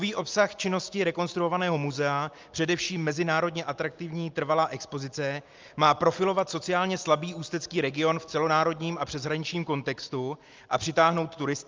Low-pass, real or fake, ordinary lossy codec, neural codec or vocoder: 7.2 kHz; real; Opus, 24 kbps; none